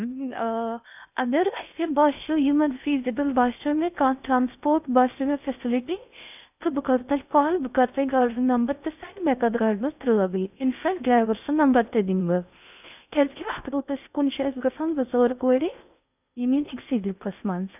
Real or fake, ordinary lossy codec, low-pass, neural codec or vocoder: fake; none; 3.6 kHz; codec, 16 kHz in and 24 kHz out, 0.6 kbps, FocalCodec, streaming, 2048 codes